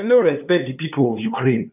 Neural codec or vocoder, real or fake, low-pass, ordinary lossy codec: codec, 16 kHz, 2 kbps, FunCodec, trained on LibriTTS, 25 frames a second; fake; 3.6 kHz; none